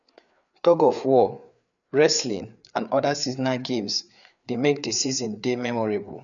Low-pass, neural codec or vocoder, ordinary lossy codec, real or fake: 7.2 kHz; codec, 16 kHz, 4 kbps, FreqCodec, larger model; none; fake